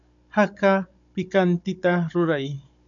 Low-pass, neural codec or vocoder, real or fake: 7.2 kHz; codec, 16 kHz, 16 kbps, FunCodec, trained on Chinese and English, 50 frames a second; fake